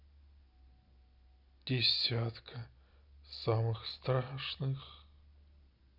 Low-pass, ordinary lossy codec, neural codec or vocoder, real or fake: 5.4 kHz; none; none; real